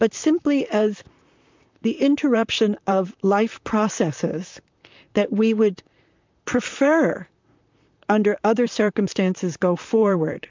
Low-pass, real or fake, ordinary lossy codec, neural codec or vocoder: 7.2 kHz; fake; MP3, 64 kbps; vocoder, 44.1 kHz, 128 mel bands, Pupu-Vocoder